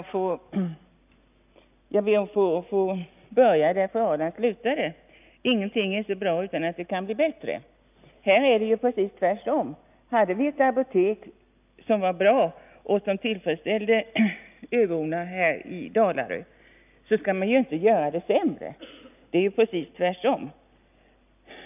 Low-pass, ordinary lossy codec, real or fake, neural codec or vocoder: 3.6 kHz; none; real; none